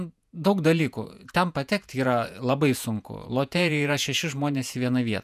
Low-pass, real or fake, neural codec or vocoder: 14.4 kHz; fake; vocoder, 48 kHz, 128 mel bands, Vocos